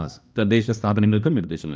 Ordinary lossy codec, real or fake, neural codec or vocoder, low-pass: none; fake; codec, 16 kHz, 1 kbps, X-Codec, HuBERT features, trained on balanced general audio; none